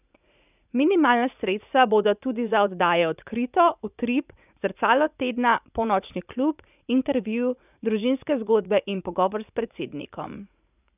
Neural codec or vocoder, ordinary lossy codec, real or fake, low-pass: vocoder, 44.1 kHz, 80 mel bands, Vocos; none; fake; 3.6 kHz